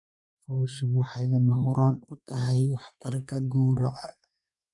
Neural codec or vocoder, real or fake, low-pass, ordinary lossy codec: codec, 24 kHz, 1 kbps, SNAC; fake; 10.8 kHz; none